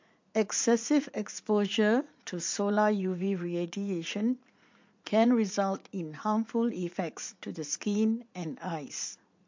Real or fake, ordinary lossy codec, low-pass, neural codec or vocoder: fake; MP3, 48 kbps; 7.2 kHz; vocoder, 44.1 kHz, 80 mel bands, Vocos